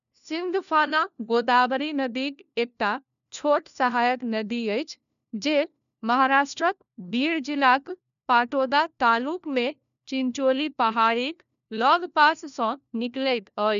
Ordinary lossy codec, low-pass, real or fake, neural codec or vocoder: none; 7.2 kHz; fake; codec, 16 kHz, 1 kbps, FunCodec, trained on LibriTTS, 50 frames a second